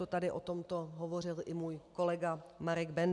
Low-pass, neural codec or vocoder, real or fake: 10.8 kHz; none; real